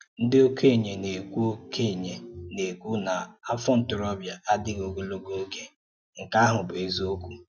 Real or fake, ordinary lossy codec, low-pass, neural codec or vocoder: real; none; none; none